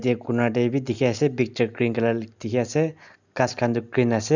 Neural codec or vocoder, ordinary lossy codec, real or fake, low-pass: none; none; real; 7.2 kHz